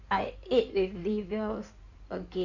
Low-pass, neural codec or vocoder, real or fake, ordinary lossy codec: 7.2 kHz; codec, 16 kHz in and 24 kHz out, 2.2 kbps, FireRedTTS-2 codec; fake; none